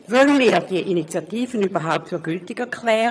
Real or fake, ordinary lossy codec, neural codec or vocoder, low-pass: fake; none; vocoder, 22.05 kHz, 80 mel bands, HiFi-GAN; none